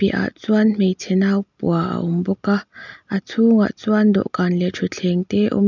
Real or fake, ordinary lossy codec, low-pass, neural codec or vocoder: real; none; 7.2 kHz; none